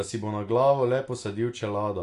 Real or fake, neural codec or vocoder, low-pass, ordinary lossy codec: real; none; 10.8 kHz; AAC, 48 kbps